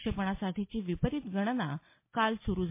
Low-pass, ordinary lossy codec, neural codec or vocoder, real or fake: 3.6 kHz; MP3, 24 kbps; none; real